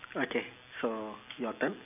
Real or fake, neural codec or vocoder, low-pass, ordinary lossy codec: real; none; 3.6 kHz; none